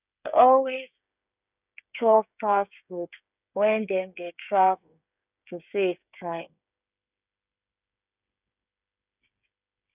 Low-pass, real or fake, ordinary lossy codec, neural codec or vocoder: 3.6 kHz; fake; none; codec, 16 kHz, 8 kbps, FreqCodec, smaller model